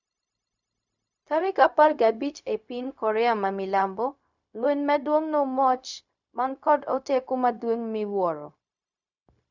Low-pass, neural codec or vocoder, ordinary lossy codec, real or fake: 7.2 kHz; codec, 16 kHz, 0.4 kbps, LongCat-Audio-Codec; none; fake